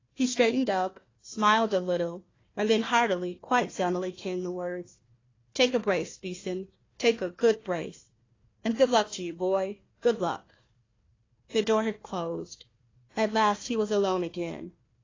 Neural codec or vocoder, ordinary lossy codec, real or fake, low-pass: codec, 16 kHz, 1 kbps, FunCodec, trained on Chinese and English, 50 frames a second; AAC, 32 kbps; fake; 7.2 kHz